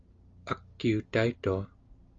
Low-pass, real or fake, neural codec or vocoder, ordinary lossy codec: 7.2 kHz; real; none; Opus, 32 kbps